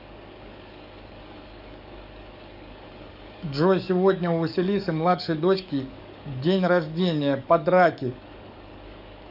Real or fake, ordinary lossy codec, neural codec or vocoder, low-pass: fake; none; codec, 44.1 kHz, 7.8 kbps, DAC; 5.4 kHz